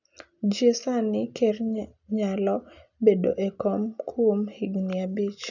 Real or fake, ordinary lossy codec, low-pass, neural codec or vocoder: real; none; 7.2 kHz; none